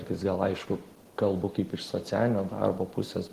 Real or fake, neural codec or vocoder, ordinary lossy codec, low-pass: real; none; Opus, 16 kbps; 14.4 kHz